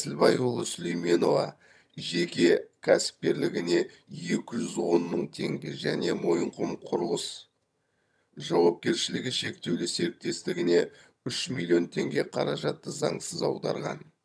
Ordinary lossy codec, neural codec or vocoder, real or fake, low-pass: none; vocoder, 22.05 kHz, 80 mel bands, HiFi-GAN; fake; none